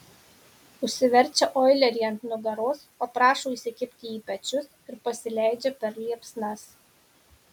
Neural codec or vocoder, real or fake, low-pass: none; real; 19.8 kHz